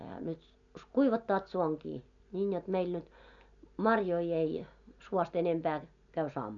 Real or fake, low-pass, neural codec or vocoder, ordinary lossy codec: real; 7.2 kHz; none; none